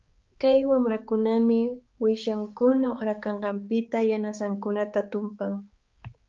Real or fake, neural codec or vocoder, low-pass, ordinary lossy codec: fake; codec, 16 kHz, 4 kbps, X-Codec, HuBERT features, trained on balanced general audio; 7.2 kHz; Opus, 32 kbps